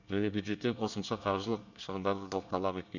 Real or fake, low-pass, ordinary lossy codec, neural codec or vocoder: fake; 7.2 kHz; none; codec, 24 kHz, 1 kbps, SNAC